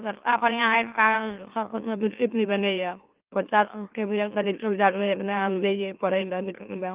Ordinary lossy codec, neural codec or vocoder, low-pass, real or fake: Opus, 24 kbps; autoencoder, 44.1 kHz, a latent of 192 numbers a frame, MeloTTS; 3.6 kHz; fake